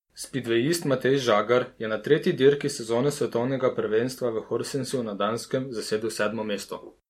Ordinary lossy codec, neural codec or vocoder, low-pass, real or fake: MP3, 64 kbps; none; 19.8 kHz; real